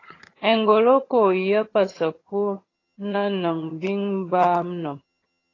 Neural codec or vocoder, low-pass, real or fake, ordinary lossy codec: vocoder, 22.05 kHz, 80 mel bands, HiFi-GAN; 7.2 kHz; fake; AAC, 32 kbps